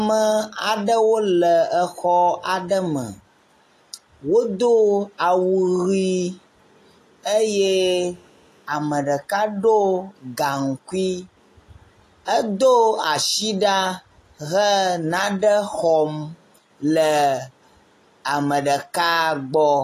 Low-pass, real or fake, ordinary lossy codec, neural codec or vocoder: 14.4 kHz; real; AAC, 48 kbps; none